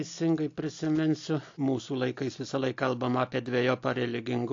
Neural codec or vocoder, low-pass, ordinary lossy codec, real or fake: none; 7.2 kHz; AAC, 48 kbps; real